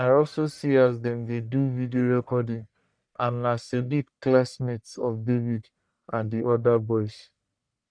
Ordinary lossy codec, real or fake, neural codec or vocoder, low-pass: none; fake; codec, 44.1 kHz, 1.7 kbps, Pupu-Codec; 9.9 kHz